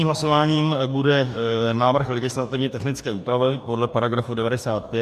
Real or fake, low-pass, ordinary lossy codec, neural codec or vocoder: fake; 14.4 kHz; MP3, 96 kbps; codec, 44.1 kHz, 2.6 kbps, DAC